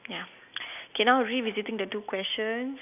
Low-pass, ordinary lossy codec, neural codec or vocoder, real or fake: 3.6 kHz; none; none; real